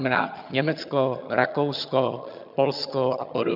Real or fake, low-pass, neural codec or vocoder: fake; 5.4 kHz; vocoder, 22.05 kHz, 80 mel bands, HiFi-GAN